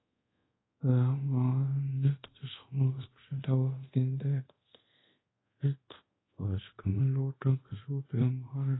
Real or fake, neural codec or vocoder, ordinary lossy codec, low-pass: fake; codec, 24 kHz, 0.5 kbps, DualCodec; AAC, 16 kbps; 7.2 kHz